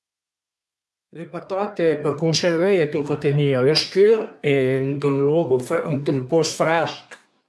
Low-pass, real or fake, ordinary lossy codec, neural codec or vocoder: none; fake; none; codec, 24 kHz, 1 kbps, SNAC